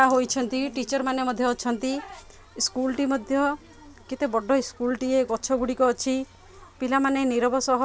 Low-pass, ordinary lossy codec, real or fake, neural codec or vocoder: none; none; real; none